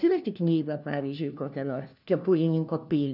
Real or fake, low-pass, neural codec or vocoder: fake; 5.4 kHz; codec, 16 kHz, 1 kbps, FunCodec, trained on LibriTTS, 50 frames a second